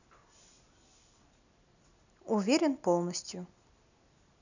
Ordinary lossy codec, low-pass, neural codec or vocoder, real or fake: none; 7.2 kHz; none; real